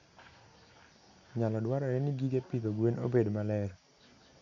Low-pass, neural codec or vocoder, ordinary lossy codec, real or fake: 7.2 kHz; none; none; real